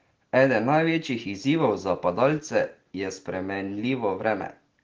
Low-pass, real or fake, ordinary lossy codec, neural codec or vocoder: 7.2 kHz; real; Opus, 16 kbps; none